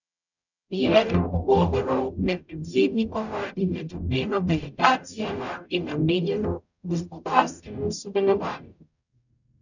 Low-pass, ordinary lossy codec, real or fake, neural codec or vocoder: 7.2 kHz; none; fake; codec, 44.1 kHz, 0.9 kbps, DAC